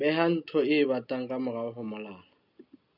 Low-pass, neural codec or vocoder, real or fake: 5.4 kHz; none; real